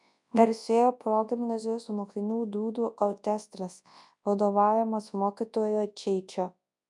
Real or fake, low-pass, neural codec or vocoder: fake; 10.8 kHz; codec, 24 kHz, 0.9 kbps, WavTokenizer, large speech release